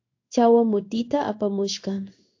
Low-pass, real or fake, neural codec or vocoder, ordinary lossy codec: 7.2 kHz; fake; codec, 16 kHz in and 24 kHz out, 1 kbps, XY-Tokenizer; AAC, 48 kbps